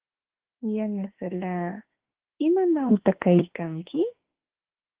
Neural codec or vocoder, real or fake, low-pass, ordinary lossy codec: autoencoder, 48 kHz, 32 numbers a frame, DAC-VAE, trained on Japanese speech; fake; 3.6 kHz; Opus, 16 kbps